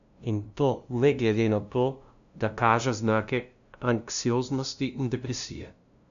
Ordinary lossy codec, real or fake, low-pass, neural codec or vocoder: none; fake; 7.2 kHz; codec, 16 kHz, 0.5 kbps, FunCodec, trained on LibriTTS, 25 frames a second